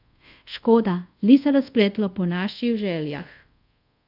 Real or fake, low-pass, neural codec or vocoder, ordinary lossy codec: fake; 5.4 kHz; codec, 24 kHz, 0.5 kbps, DualCodec; AAC, 48 kbps